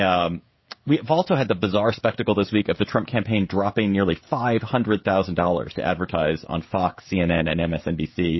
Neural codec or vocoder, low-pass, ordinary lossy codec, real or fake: codec, 16 kHz, 16 kbps, FreqCodec, smaller model; 7.2 kHz; MP3, 24 kbps; fake